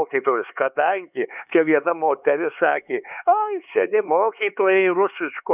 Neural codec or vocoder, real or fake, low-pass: codec, 16 kHz, 4 kbps, X-Codec, HuBERT features, trained on LibriSpeech; fake; 3.6 kHz